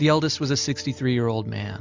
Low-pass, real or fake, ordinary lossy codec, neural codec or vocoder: 7.2 kHz; real; MP3, 64 kbps; none